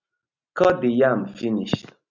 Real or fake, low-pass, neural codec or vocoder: real; 7.2 kHz; none